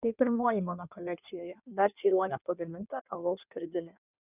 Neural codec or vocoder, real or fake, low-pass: codec, 16 kHz in and 24 kHz out, 1.1 kbps, FireRedTTS-2 codec; fake; 3.6 kHz